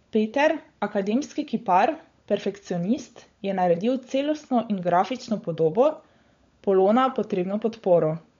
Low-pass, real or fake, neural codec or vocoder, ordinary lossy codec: 7.2 kHz; fake; codec, 16 kHz, 16 kbps, FunCodec, trained on LibriTTS, 50 frames a second; MP3, 48 kbps